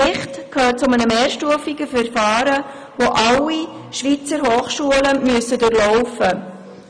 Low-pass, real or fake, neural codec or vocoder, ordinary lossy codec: 9.9 kHz; real; none; none